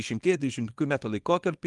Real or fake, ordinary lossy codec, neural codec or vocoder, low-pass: fake; Opus, 24 kbps; codec, 24 kHz, 0.9 kbps, WavTokenizer, small release; 10.8 kHz